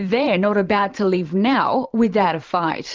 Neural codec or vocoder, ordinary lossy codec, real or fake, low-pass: vocoder, 44.1 kHz, 128 mel bands every 512 samples, BigVGAN v2; Opus, 32 kbps; fake; 7.2 kHz